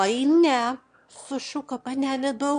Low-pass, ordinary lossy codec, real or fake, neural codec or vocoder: 9.9 kHz; MP3, 64 kbps; fake; autoencoder, 22.05 kHz, a latent of 192 numbers a frame, VITS, trained on one speaker